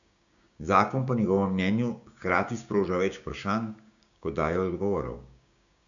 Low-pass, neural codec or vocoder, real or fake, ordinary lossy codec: 7.2 kHz; codec, 16 kHz, 6 kbps, DAC; fake; MP3, 96 kbps